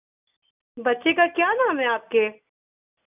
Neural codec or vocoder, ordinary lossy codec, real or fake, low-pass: none; none; real; 3.6 kHz